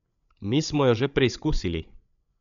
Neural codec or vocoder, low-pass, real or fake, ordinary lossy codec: codec, 16 kHz, 8 kbps, FreqCodec, larger model; 7.2 kHz; fake; none